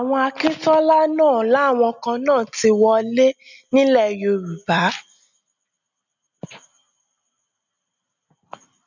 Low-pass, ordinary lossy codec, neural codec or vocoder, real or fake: 7.2 kHz; none; none; real